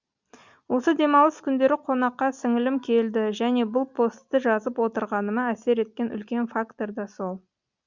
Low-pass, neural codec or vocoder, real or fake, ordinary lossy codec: 7.2 kHz; none; real; Opus, 64 kbps